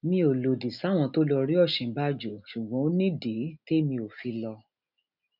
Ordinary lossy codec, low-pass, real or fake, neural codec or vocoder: none; 5.4 kHz; real; none